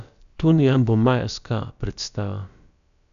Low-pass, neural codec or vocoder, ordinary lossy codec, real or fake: 7.2 kHz; codec, 16 kHz, about 1 kbps, DyCAST, with the encoder's durations; none; fake